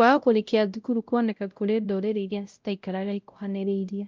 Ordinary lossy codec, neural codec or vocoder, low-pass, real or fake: Opus, 24 kbps; codec, 16 kHz, 0.5 kbps, X-Codec, WavLM features, trained on Multilingual LibriSpeech; 7.2 kHz; fake